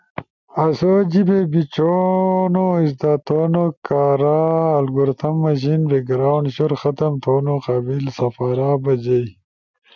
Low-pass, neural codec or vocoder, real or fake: 7.2 kHz; none; real